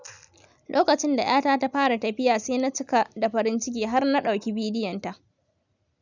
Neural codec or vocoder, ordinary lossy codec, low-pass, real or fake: none; none; 7.2 kHz; real